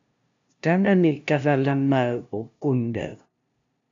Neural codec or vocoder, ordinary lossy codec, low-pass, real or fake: codec, 16 kHz, 0.5 kbps, FunCodec, trained on LibriTTS, 25 frames a second; AAC, 64 kbps; 7.2 kHz; fake